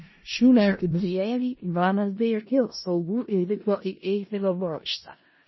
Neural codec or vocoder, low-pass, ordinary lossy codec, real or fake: codec, 16 kHz in and 24 kHz out, 0.4 kbps, LongCat-Audio-Codec, four codebook decoder; 7.2 kHz; MP3, 24 kbps; fake